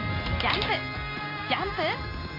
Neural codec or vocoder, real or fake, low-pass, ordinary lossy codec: none; real; 5.4 kHz; MP3, 48 kbps